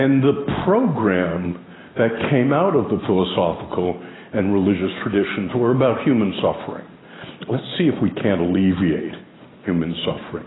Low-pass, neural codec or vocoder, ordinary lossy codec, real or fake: 7.2 kHz; none; AAC, 16 kbps; real